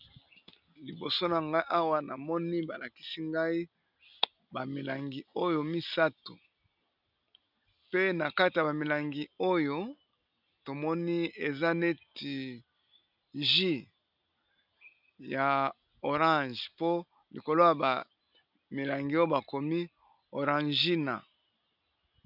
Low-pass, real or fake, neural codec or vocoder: 5.4 kHz; real; none